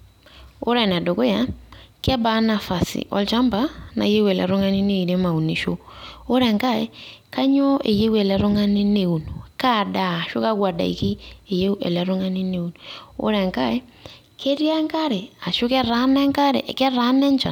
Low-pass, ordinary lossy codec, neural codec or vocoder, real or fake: 19.8 kHz; none; none; real